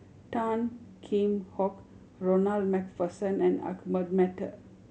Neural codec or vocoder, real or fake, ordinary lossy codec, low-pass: none; real; none; none